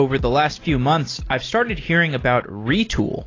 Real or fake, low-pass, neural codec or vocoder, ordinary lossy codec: real; 7.2 kHz; none; AAC, 32 kbps